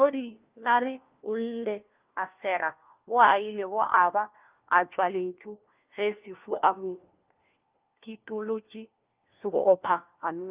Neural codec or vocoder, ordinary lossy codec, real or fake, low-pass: codec, 16 kHz, 1 kbps, FunCodec, trained on LibriTTS, 50 frames a second; Opus, 32 kbps; fake; 3.6 kHz